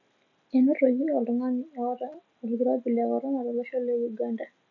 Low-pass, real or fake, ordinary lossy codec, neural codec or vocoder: 7.2 kHz; real; AAC, 48 kbps; none